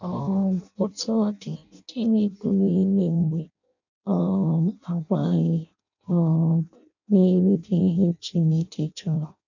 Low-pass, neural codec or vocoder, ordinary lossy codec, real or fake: 7.2 kHz; codec, 16 kHz in and 24 kHz out, 0.6 kbps, FireRedTTS-2 codec; none; fake